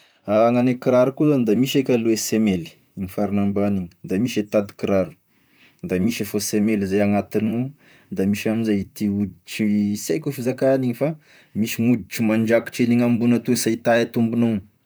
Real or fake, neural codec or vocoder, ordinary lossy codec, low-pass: fake; vocoder, 44.1 kHz, 128 mel bands, Pupu-Vocoder; none; none